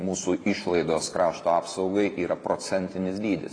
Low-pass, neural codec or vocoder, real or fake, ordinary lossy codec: 9.9 kHz; vocoder, 44.1 kHz, 128 mel bands every 512 samples, BigVGAN v2; fake; AAC, 32 kbps